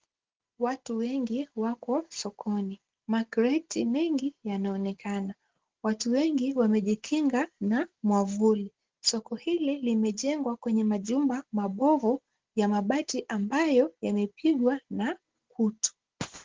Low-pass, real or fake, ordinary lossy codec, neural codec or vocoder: 7.2 kHz; real; Opus, 16 kbps; none